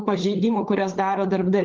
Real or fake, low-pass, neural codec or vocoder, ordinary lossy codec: fake; 7.2 kHz; codec, 16 kHz, 16 kbps, FunCodec, trained on LibriTTS, 50 frames a second; Opus, 16 kbps